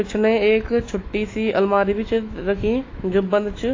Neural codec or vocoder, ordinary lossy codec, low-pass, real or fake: autoencoder, 48 kHz, 128 numbers a frame, DAC-VAE, trained on Japanese speech; AAC, 32 kbps; 7.2 kHz; fake